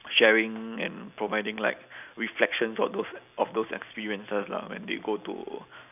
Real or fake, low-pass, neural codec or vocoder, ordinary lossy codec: real; 3.6 kHz; none; none